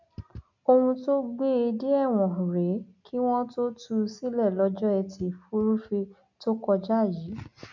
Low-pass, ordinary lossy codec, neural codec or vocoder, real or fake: 7.2 kHz; none; none; real